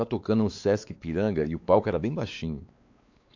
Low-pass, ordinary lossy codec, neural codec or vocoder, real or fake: 7.2 kHz; AAC, 48 kbps; codec, 16 kHz, 4 kbps, X-Codec, WavLM features, trained on Multilingual LibriSpeech; fake